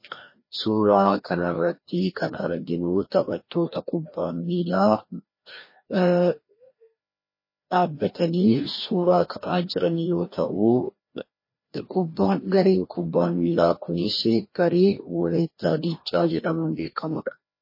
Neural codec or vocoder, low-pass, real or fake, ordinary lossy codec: codec, 16 kHz, 1 kbps, FreqCodec, larger model; 5.4 kHz; fake; MP3, 24 kbps